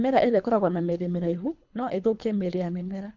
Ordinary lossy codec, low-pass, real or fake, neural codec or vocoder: none; 7.2 kHz; fake; codec, 24 kHz, 3 kbps, HILCodec